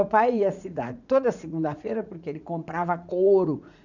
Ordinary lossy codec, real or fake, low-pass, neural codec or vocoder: none; fake; 7.2 kHz; vocoder, 44.1 kHz, 80 mel bands, Vocos